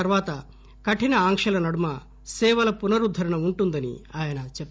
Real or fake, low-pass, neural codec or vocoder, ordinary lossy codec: real; none; none; none